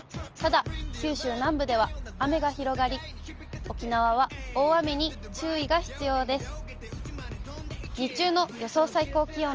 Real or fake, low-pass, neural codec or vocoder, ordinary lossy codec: real; 7.2 kHz; none; Opus, 24 kbps